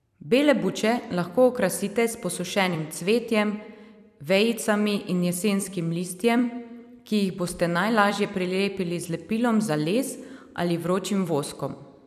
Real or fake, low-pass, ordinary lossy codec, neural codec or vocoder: fake; 14.4 kHz; none; vocoder, 44.1 kHz, 128 mel bands every 256 samples, BigVGAN v2